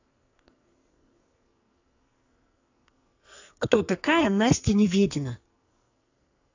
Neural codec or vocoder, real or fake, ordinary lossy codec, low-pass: codec, 44.1 kHz, 2.6 kbps, SNAC; fake; AAC, 48 kbps; 7.2 kHz